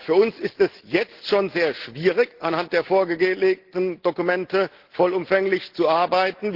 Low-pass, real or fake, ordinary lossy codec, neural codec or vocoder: 5.4 kHz; real; Opus, 16 kbps; none